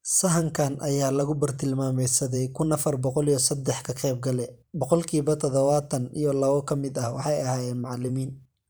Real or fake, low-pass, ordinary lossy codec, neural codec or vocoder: fake; none; none; vocoder, 44.1 kHz, 128 mel bands every 512 samples, BigVGAN v2